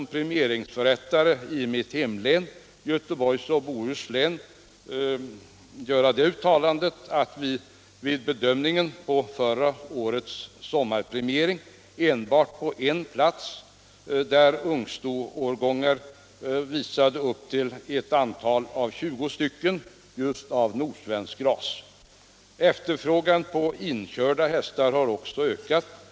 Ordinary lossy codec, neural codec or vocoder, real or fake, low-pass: none; none; real; none